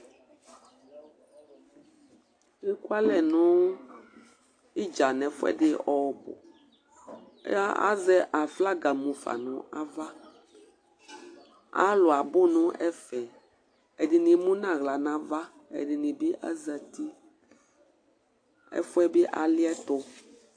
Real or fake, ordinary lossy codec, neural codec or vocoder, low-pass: real; AAC, 48 kbps; none; 9.9 kHz